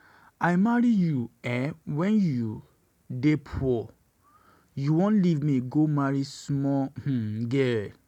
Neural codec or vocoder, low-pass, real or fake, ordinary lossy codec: none; 19.8 kHz; real; none